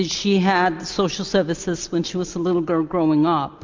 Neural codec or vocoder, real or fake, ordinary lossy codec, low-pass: none; real; MP3, 64 kbps; 7.2 kHz